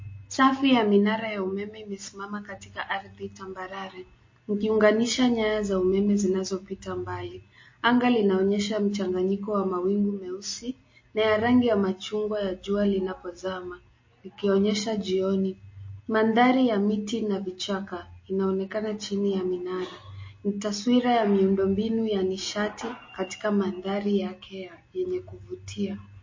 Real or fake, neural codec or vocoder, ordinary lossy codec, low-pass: real; none; MP3, 32 kbps; 7.2 kHz